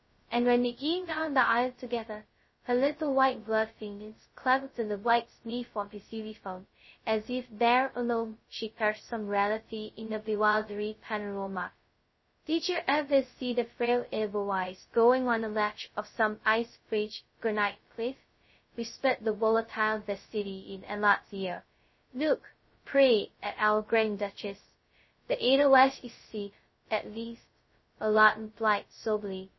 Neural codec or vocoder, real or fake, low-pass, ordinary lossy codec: codec, 16 kHz, 0.2 kbps, FocalCodec; fake; 7.2 kHz; MP3, 24 kbps